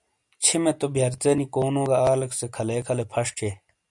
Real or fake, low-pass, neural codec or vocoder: real; 10.8 kHz; none